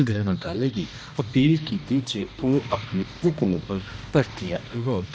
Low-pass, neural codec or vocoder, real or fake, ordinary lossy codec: none; codec, 16 kHz, 1 kbps, X-Codec, HuBERT features, trained on balanced general audio; fake; none